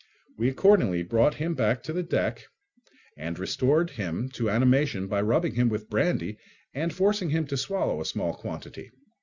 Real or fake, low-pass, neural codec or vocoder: real; 7.2 kHz; none